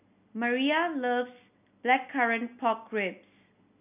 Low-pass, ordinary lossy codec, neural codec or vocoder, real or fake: 3.6 kHz; none; none; real